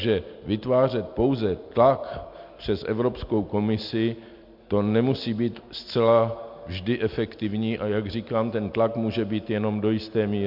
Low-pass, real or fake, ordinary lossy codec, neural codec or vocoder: 5.4 kHz; real; MP3, 48 kbps; none